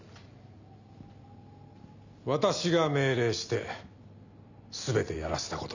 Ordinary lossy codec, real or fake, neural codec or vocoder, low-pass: none; real; none; 7.2 kHz